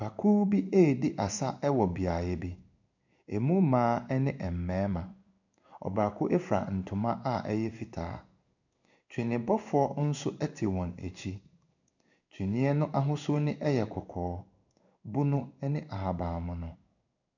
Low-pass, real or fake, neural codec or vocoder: 7.2 kHz; fake; codec, 16 kHz in and 24 kHz out, 1 kbps, XY-Tokenizer